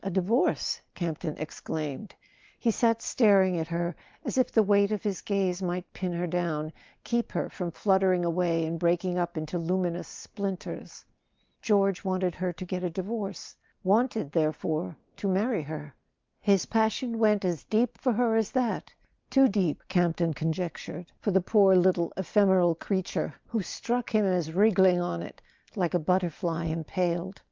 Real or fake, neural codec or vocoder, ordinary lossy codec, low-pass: real; none; Opus, 32 kbps; 7.2 kHz